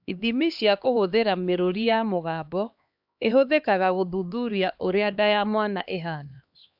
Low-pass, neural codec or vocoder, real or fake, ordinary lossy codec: 5.4 kHz; codec, 16 kHz, 2 kbps, X-Codec, HuBERT features, trained on LibriSpeech; fake; AAC, 48 kbps